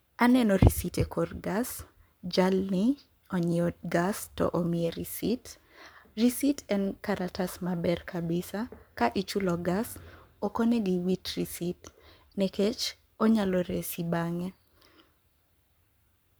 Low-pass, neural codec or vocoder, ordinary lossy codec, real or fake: none; codec, 44.1 kHz, 7.8 kbps, Pupu-Codec; none; fake